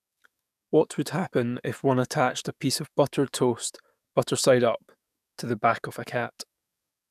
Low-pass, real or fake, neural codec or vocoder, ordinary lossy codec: 14.4 kHz; fake; codec, 44.1 kHz, 7.8 kbps, DAC; none